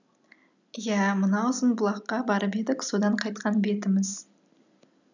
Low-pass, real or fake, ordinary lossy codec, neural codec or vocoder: 7.2 kHz; real; none; none